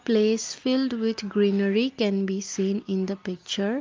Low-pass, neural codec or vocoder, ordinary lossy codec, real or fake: 7.2 kHz; none; Opus, 32 kbps; real